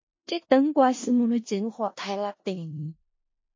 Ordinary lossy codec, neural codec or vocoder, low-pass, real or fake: MP3, 32 kbps; codec, 16 kHz in and 24 kHz out, 0.4 kbps, LongCat-Audio-Codec, four codebook decoder; 7.2 kHz; fake